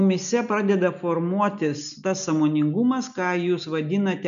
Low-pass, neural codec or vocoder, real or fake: 7.2 kHz; none; real